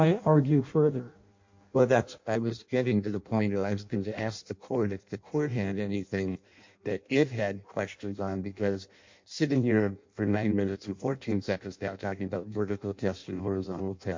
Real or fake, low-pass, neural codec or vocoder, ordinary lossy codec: fake; 7.2 kHz; codec, 16 kHz in and 24 kHz out, 0.6 kbps, FireRedTTS-2 codec; MP3, 48 kbps